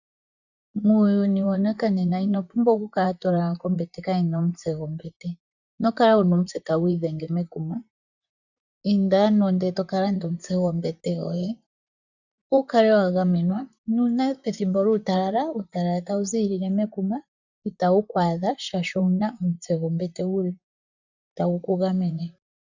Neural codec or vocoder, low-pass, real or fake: vocoder, 44.1 kHz, 128 mel bands, Pupu-Vocoder; 7.2 kHz; fake